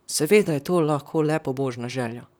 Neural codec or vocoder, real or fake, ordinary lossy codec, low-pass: vocoder, 44.1 kHz, 128 mel bands, Pupu-Vocoder; fake; none; none